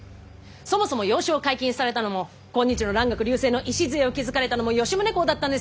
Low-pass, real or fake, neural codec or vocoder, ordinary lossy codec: none; real; none; none